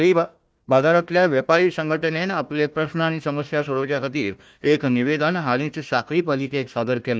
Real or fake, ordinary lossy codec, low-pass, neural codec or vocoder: fake; none; none; codec, 16 kHz, 1 kbps, FunCodec, trained on Chinese and English, 50 frames a second